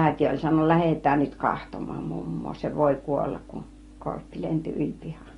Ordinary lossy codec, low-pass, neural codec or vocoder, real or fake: AAC, 32 kbps; 10.8 kHz; none; real